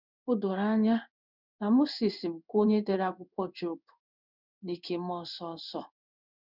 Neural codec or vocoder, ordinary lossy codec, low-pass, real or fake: codec, 16 kHz in and 24 kHz out, 1 kbps, XY-Tokenizer; none; 5.4 kHz; fake